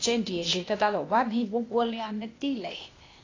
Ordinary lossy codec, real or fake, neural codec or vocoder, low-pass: AAC, 32 kbps; fake; codec, 16 kHz, 0.8 kbps, ZipCodec; 7.2 kHz